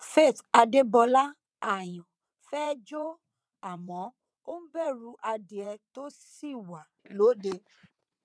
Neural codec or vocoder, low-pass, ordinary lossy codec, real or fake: vocoder, 22.05 kHz, 80 mel bands, WaveNeXt; none; none; fake